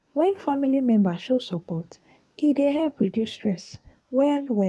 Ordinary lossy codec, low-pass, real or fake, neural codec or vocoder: none; none; fake; codec, 24 kHz, 1 kbps, SNAC